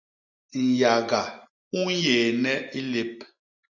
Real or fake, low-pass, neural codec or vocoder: real; 7.2 kHz; none